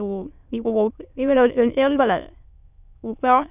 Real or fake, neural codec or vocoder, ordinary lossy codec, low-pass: fake; autoencoder, 22.05 kHz, a latent of 192 numbers a frame, VITS, trained on many speakers; none; 3.6 kHz